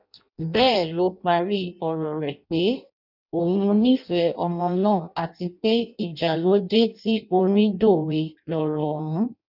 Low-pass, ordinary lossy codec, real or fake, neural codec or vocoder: 5.4 kHz; none; fake; codec, 16 kHz in and 24 kHz out, 0.6 kbps, FireRedTTS-2 codec